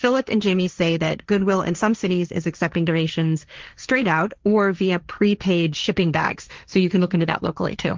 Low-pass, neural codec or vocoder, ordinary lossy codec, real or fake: 7.2 kHz; codec, 16 kHz, 1.1 kbps, Voila-Tokenizer; Opus, 32 kbps; fake